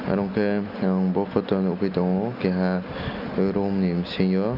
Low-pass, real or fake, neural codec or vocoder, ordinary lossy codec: 5.4 kHz; real; none; none